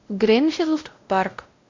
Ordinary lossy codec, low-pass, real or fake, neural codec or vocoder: MP3, 64 kbps; 7.2 kHz; fake; codec, 16 kHz, 0.5 kbps, X-Codec, WavLM features, trained on Multilingual LibriSpeech